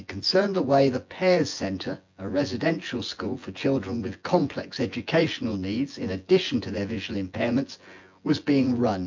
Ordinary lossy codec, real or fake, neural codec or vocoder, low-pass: MP3, 48 kbps; fake; vocoder, 24 kHz, 100 mel bands, Vocos; 7.2 kHz